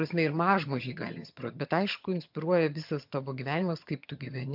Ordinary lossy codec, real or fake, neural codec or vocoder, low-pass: MP3, 48 kbps; fake; vocoder, 22.05 kHz, 80 mel bands, HiFi-GAN; 5.4 kHz